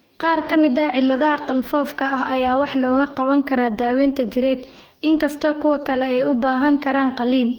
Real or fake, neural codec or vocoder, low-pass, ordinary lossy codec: fake; codec, 44.1 kHz, 2.6 kbps, DAC; 19.8 kHz; Opus, 32 kbps